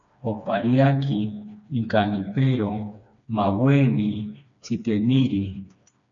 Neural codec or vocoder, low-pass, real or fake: codec, 16 kHz, 2 kbps, FreqCodec, smaller model; 7.2 kHz; fake